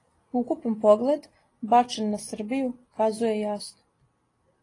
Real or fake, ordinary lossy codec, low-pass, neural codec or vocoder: real; AAC, 32 kbps; 10.8 kHz; none